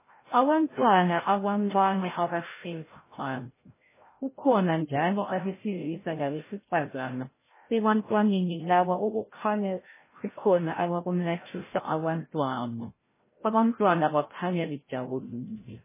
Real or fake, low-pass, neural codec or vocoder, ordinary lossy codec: fake; 3.6 kHz; codec, 16 kHz, 0.5 kbps, FreqCodec, larger model; MP3, 16 kbps